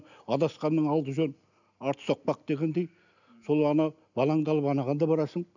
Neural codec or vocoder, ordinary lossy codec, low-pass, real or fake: none; none; 7.2 kHz; real